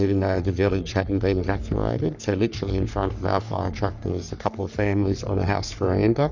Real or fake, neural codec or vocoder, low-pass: fake; codec, 44.1 kHz, 3.4 kbps, Pupu-Codec; 7.2 kHz